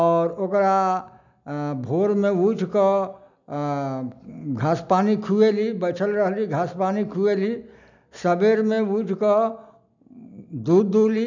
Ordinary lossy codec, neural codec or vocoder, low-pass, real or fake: none; none; 7.2 kHz; real